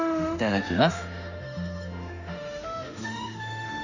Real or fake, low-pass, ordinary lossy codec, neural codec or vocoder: fake; 7.2 kHz; none; autoencoder, 48 kHz, 32 numbers a frame, DAC-VAE, trained on Japanese speech